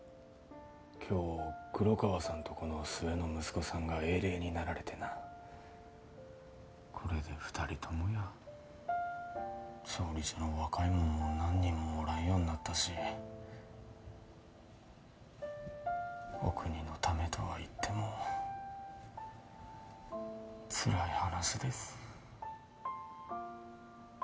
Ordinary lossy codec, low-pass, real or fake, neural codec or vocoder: none; none; real; none